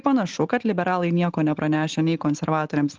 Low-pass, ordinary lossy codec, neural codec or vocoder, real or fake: 7.2 kHz; Opus, 16 kbps; none; real